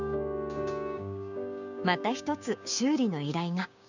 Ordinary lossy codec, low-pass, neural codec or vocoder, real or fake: none; 7.2 kHz; codec, 16 kHz, 6 kbps, DAC; fake